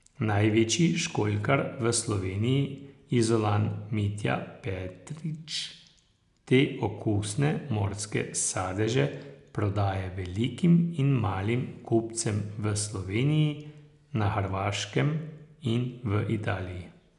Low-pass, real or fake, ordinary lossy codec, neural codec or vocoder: 10.8 kHz; real; none; none